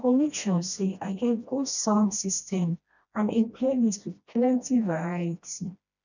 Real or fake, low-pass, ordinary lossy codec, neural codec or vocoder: fake; 7.2 kHz; none; codec, 16 kHz, 1 kbps, FreqCodec, smaller model